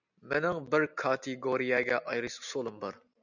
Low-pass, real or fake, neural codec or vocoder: 7.2 kHz; real; none